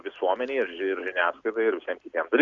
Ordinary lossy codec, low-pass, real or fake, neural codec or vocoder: Opus, 64 kbps; 7.2 kHz; real; none